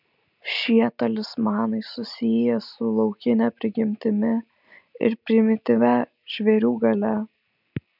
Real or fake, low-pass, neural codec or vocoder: real; 5.4 kHz; none